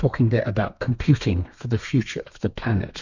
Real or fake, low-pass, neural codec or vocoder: fake; 7.2 kHz; codec, 32 kHz, 1.9 kbps, SNAC